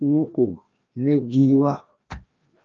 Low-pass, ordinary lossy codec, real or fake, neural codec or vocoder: 7.2 kHz; Opus, 24 kbps; fake; codec, 16 kHz, 1 kbps, FreqCodec, larger model